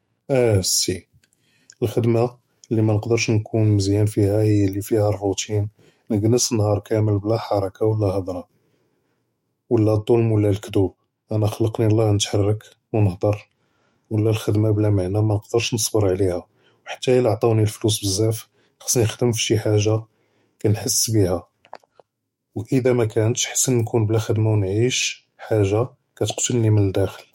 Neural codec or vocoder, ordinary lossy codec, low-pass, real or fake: autoencoder, 48 kHz, 128 numbers a frame, DAC-VAE, trained on Japanese speech; MP3, 64 kbps; 19.8 kHz; fake